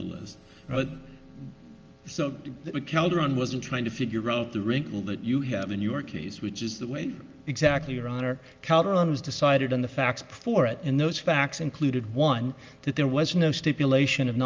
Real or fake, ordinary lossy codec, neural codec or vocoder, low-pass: real; Opus, 24 kbps; none; 7.2 kHz